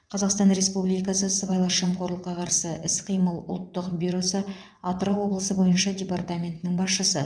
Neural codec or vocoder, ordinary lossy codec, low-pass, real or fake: vocoder, 22.05 kHz, 80 mel bands, WaveNeXt; none; 9.9 kHz; fake